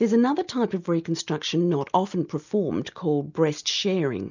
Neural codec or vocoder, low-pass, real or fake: none; 7.2 kHz; real